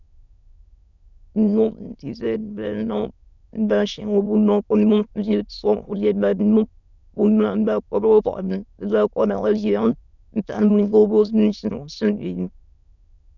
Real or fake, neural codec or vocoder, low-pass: fake; autoencoder, 22.05 kHz, a latent of 192 numbers a frame, VITS, trained on many speakers; 7.2 kHz